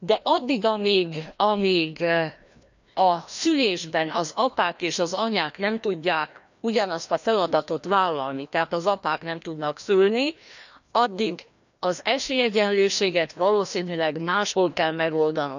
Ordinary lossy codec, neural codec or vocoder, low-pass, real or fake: none; codec, 16 kHz, 1 kbps, FreqCodec, larger model; 7.2 kHz; fake